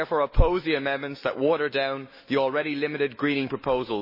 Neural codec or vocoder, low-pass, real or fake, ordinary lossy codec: autoencoder, 48 kHz, 128 numbers a frame, DAC-VAE, trained on Japanese speech; 5.4 kHz; fake; MP3, 24 kbps